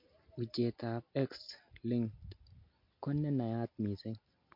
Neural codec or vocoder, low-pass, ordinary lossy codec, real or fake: none; 5.4 kHz; MP3, 32 kbps; real